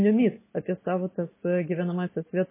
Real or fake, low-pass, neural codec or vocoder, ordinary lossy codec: real; 3.6 kHz; none; MP3, 16 kbps